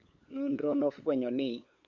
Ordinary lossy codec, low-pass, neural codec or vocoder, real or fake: Opus, 64 kbps; 7.2 kHz; codec, 16 kHz, 8 kbps, FunCodec, trained on LibriTTS, 25 frames a second; fake